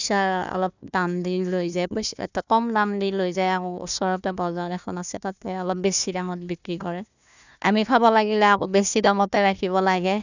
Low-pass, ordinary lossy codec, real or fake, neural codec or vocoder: 7.2 kHz; none; fake; codec, 16 kHz, 1 kbps, FunCodec, trained on Chinese and English, 50 frames a second